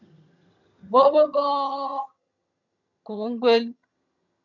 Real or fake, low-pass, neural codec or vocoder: fake; 7.2 kHz; vocoder, 22.05 kHz, 80 mel bands, HiFi-GAN